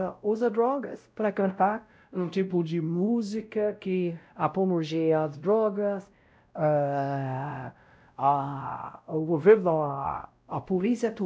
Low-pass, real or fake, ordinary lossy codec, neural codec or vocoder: none; fake; none; codec, 16 kHz, 0.5 kbps, X-Codec, WavLM features, trained on Multilingual LibriSpeech